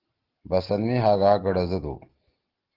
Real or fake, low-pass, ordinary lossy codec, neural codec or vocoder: real; 5.4 kHz; Opus, 16 kbps; none